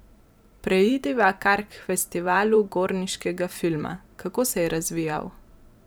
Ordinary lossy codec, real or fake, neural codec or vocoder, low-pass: none; fake; vocoder, 44.1 kHz, 128 mel bands every 512 samples, BigVGAN v2; none